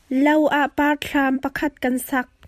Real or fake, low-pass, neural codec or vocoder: fake; 14.4 kHz; vocoder, 44.1 kHz, 128 mel bands every 256 samples, BigVGAN v2